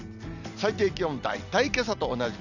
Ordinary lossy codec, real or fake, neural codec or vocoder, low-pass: none; real; none; 7.2 kHz